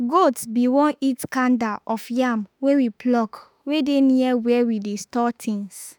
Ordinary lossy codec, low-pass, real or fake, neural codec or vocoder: none; none; fake; autoencoder, 48 kHz, 32 numbers a frame, DAC-VAE, trained on Japanese speech